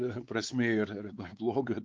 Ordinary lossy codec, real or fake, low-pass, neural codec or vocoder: Opus, 32 kbps; fake; 7.2 kHz; codec, 16 kHz, 4 kbps, X-Codec, WavLM features, trained on Multilingual LibriSpeech